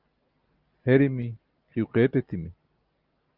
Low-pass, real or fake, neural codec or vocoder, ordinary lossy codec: 5.4 kHz; real; none; Opus, 32 kbps